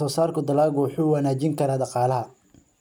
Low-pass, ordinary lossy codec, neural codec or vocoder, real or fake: 19.8 kHz; none; none; real